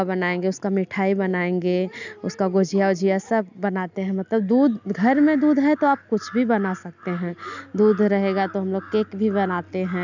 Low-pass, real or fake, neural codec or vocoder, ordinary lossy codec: 7.2 kHz; real; none; none